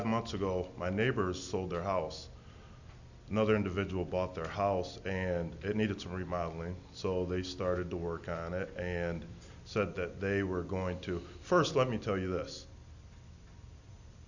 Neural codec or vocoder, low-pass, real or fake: none; 7.2 kHz; real